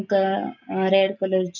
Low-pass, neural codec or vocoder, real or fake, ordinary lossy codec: 7.2 kHz; none; real; none